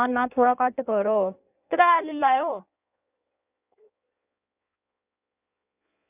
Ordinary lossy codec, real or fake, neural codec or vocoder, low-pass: none; fake; codec, 16 kHz in and 24 kHz out, 1.1 kbps, FireRedTTS-2 codec; 3.6 kHz